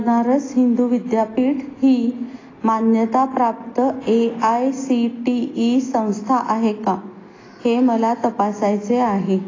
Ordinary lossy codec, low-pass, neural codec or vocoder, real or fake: AAC, 32 kbps; 7.2 kHz; none; real